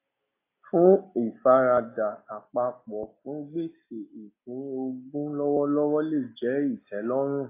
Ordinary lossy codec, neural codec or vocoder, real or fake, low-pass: AAC, 24 kbps; none; real; 3.6 kHz